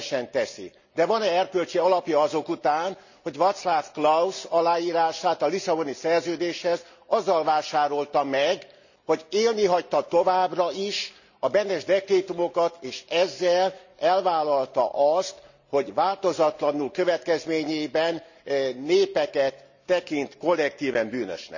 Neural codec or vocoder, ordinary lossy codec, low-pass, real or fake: none; none; 7.2 kHz; real